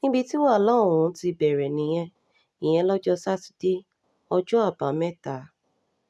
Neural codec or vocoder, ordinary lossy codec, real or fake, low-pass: none; none; real; none